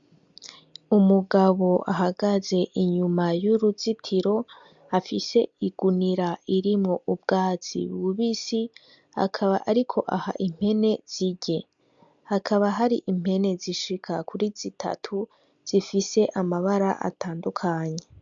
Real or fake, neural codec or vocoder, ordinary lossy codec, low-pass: real; none; MP3, 64 kbps; 7.2 kHz